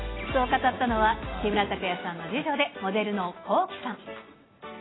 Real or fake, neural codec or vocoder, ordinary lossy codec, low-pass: real; none; AAC, 16 kbps; 7.2 kHz